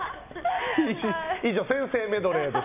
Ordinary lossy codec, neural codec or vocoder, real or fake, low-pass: none; none; real; 3.6 kHz